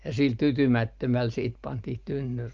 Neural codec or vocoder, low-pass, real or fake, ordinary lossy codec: none; 7.2 kHz; real; Opus, 24 kbps